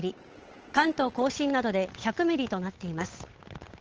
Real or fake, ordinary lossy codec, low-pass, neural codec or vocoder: fake; Opus, 16 kbps; 7.2 kHz; codec, 16 kHz, 8 kbps, FunCodec, trained on Chinese and English, 25 frames a second